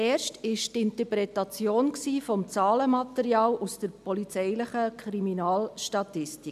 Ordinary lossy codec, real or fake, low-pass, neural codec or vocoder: none; real; 14.4 kHz; none